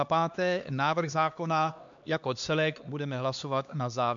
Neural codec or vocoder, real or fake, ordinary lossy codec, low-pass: codec, 16 kHz, 2 kbps, X-Codec, HuBERT features, trained on LibriSpeech; fake; MP3, 64 kbps; 7.2 kHz